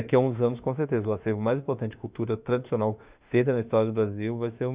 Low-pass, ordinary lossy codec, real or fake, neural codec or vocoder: 3.6 kHz; Opus, 64 kbps; fake; autoencoder, 48 kHz, 32 numbers a frame, DAC-VAE, trained on Japanese speech